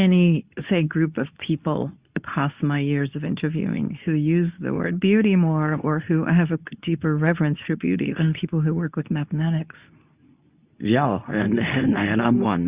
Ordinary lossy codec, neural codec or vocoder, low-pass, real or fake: Opus, 64 kbps; codec, 24 kHz, 0.9 kbps, WavTokenizer, medium speech release version 2; 3.6 kHz; fake